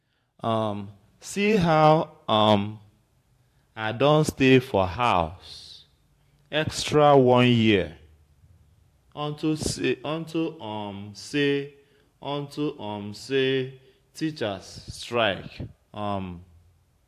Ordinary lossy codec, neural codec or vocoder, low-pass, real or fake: AAC, 64 kbps; vocoder, 44.1 kHz, 128 mel bands every 512 samples, BigVGAN v2; 14.4 kHz; fake